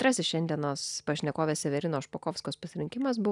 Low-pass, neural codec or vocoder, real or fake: 10.8 kHz; none; real